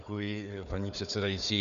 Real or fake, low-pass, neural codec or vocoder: fake; 7.2 kHz; codec, 16 kHz, 4 kbps, FunCodec, trained on Chinese and English, 50 frames a second